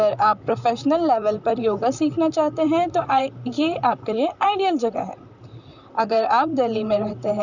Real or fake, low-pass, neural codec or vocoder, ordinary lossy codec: fake; 7.2 kHz; vocoder, 44.1 kHz, 128 mel bands, Pupu-Vocoder; none